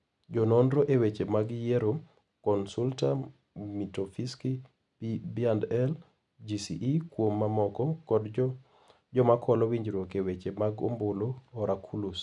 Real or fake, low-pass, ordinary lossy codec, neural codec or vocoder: real; 10.8 kHz; none; none